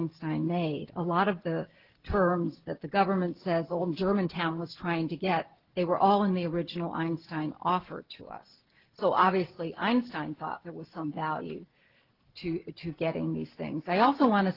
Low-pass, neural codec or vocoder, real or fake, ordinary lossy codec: 5.4 kHz; none; real; Opus, 16 kbps